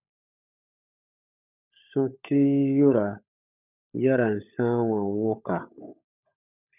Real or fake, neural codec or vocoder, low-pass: fake; codec, 16 kHz, 16 kbps, FunCodec, trained on LibriTTS, 50 frames a second; 3.6 kHz